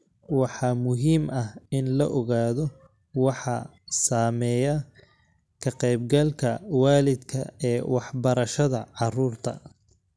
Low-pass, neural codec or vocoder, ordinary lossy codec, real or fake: none; none; none; real